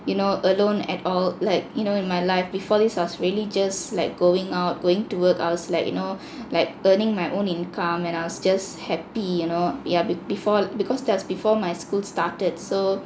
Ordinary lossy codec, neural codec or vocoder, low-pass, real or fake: none; none; none; real